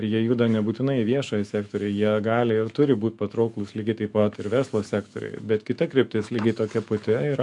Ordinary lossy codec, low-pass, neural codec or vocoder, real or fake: MP3, 64 kbps; 10.8 kHz; autoencoder, 48 kHz, 128 numbers a frame, DAC-VAE, trained on Japanese speech; fake